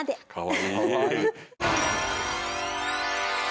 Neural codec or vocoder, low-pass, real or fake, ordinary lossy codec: none; none; real; none